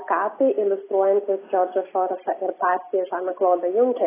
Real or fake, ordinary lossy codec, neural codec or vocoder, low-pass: real; AAC, 16 kbps; none; 3.6 kHz